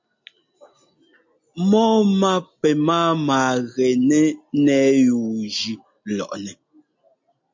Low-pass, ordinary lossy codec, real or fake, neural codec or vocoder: 7.2 kHz; MP3, 48 kbps; real; none